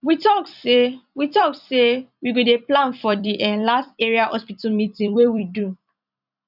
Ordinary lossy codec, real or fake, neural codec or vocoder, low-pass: none; fake; vocoder, 44.1 kHz, 128 mel bands every 256 samples, BigVGAN v2; 5.4 kHz